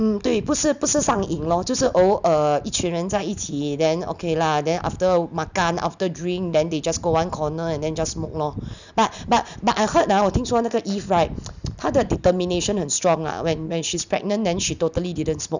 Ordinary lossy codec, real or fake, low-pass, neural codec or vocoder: none; real; 7.2 kHz; none